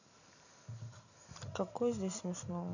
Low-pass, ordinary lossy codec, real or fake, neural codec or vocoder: 7.2 kHz; none; real; none